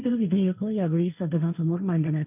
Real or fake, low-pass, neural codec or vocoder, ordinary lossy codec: fake; 3.6 kHz; codec, 16 kHz, 1.1 kbps, Voila-Tokenizer; none